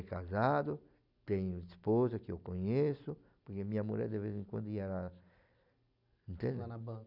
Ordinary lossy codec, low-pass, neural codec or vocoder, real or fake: none; 5.4 kHz; none; real